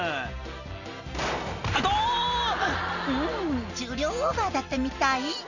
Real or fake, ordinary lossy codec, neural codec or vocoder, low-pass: real; none; none; 7.2 kHz